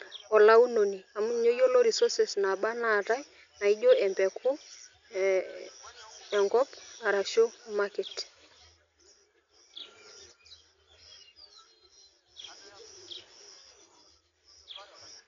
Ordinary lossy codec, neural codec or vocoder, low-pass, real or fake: MP3, 64 kbps; none; 7.2 kHz; real